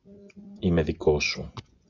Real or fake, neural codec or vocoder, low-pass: fake; vocoder, 24 kHz, 100 mel bands, Vocos; 7.2 kHz